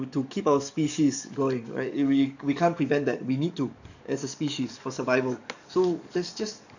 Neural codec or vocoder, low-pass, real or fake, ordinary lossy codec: codec, 44.1 kHz, 7.8 kbps, DAC; 7.2 kHz; fake; none